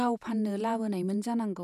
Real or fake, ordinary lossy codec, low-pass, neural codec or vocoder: fake; none; 14.4 kHz; vocoder, 48 kHz, 128 mel bands, Vocos